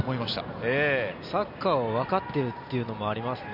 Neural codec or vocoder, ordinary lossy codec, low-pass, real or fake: none; none; 5.4 kHz; real